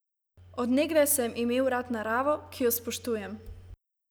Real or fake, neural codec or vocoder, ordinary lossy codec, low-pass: real; none; none; none